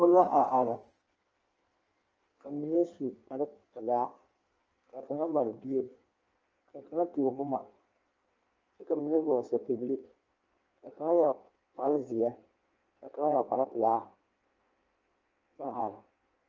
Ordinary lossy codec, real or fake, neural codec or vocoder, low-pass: Opus, 24 kbps; fake; codec, 16 kHz in and 24 kHz out, 1.1 kbps, FireRedTTS-2 codec; 7.2 kHz